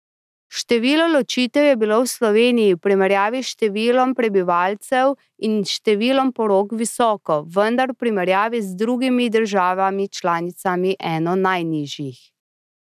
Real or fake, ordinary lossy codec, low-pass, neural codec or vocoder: real; none; 14.4 kHz; none